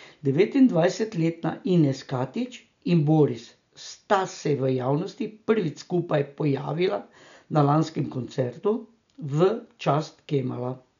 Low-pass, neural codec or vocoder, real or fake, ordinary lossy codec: 7.2 kHz; none; real; none